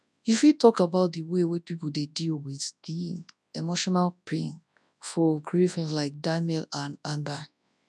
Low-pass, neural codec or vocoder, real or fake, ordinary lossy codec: none; codec, 24 kHz, 0.9 kbps, WavTokenizer, large speech release; fake; none